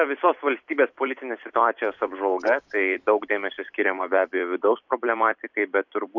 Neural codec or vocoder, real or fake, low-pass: vocoder, 44.1 kHz, 128 mel bands every 256 samples, BigVGAN v2; fake; 7.2 kHz